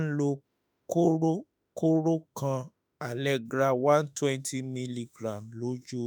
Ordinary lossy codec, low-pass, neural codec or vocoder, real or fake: none; none; autoencoder, 48 kHz, 32 numbers a frame, DAC-VAE, trained on Japanese speech; fake